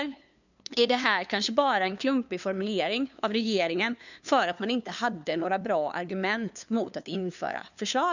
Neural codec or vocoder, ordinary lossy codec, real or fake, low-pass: codec, 16 kHz, 2 kbps, FunCodec, trained on LibriTTS, 25 frames a second; none; fake; 7.2 kHz